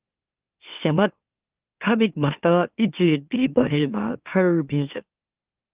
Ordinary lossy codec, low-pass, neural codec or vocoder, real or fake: Opus, 24 kbps; 3.6 kHz; autoencoder, 44.1 kHz, a latent of 192 numbers a frame, MeloTTS; fake